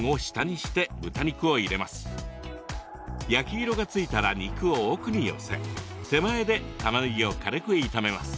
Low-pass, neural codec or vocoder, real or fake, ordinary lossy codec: none; none; real; none